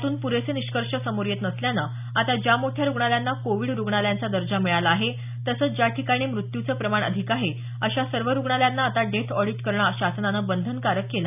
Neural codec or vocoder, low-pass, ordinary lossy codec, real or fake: none; 3.6 kHz; none; real